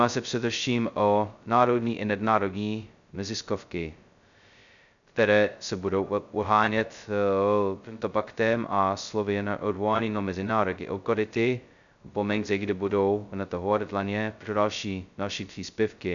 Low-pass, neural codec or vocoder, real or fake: 7.2 kHz; codec, 16 kHz, 0.2 kbps, FocalCodec; fake